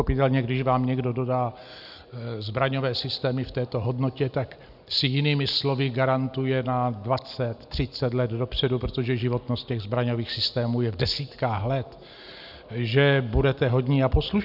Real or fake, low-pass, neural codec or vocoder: real; 5.4 kHz; none